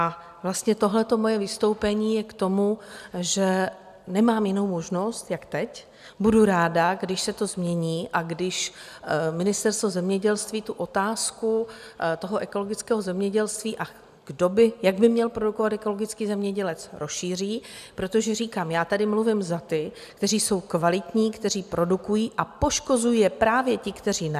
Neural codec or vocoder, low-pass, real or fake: none; 14.4 kHz; real